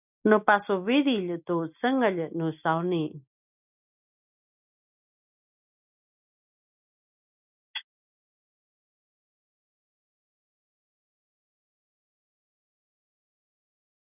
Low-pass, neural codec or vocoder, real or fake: 3.6 kHz; none; real